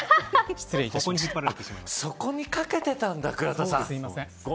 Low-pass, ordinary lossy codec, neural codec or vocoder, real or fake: none; none; none; real